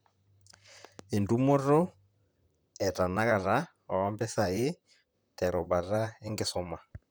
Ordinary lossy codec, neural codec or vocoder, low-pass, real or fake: none; vocoder, 44.1 kHz, 128 mel bands, Pupu-Vocoder; none; fake